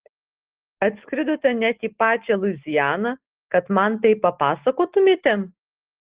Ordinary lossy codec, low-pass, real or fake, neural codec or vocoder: Opus, 16 kbps; 3.6 kHz; real; none